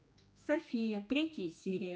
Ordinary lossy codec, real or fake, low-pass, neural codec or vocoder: none; fake; none; codec, 16 kHz, 2 kbps, X-Codec, HuBERT features, trained on general audio